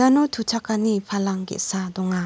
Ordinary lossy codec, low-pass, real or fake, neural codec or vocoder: none; none; real; none